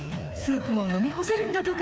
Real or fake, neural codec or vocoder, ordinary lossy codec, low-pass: fake; codec, 16 kHz, 2 kbps, FreqCodec, larger model; none; none